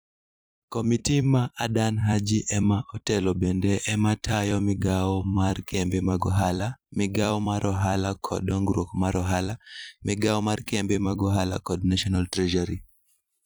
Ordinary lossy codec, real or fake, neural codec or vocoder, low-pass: none; fake; vocoder, 44.1 kHz, 128 mel bands every 256 samples, BigVGAN v2; none